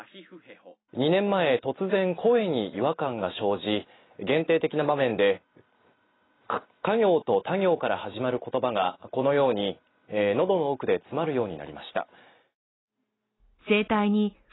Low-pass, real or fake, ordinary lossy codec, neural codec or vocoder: 7.2 kHz; real; AAC, 16 kbps; none